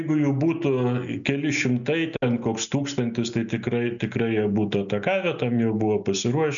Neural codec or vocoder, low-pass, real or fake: none; 7.2 kHz; real